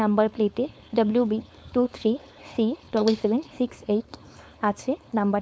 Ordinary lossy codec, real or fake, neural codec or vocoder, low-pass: none; fake; codec, 16 kHz, 4.8 kbps, FACodec; none